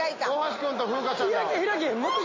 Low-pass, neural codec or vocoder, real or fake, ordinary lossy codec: 7.2 kHz; none; real; MP3, 32 kbps